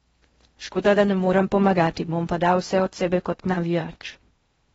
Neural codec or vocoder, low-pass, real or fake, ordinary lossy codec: codec, 16 kHz in and 24 kHz out, 0.6 kbps, FocalCodec, streaming, 4096 codes; 10.8 kHz; fake; AAC, 24 kbps